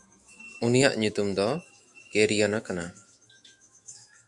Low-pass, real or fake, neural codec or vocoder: 10.8 kHz; fake; autoencoder, 48 kHz, 128 numbers a frame, DAC-VAE, trained on Japanese speech